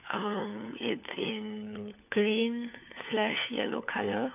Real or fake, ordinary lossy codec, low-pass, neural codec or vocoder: fake; none; 3.6 kHz; codec, 16 kHz, 4 kbps, FunCodec, trained on LibriTTS, 50 frames a second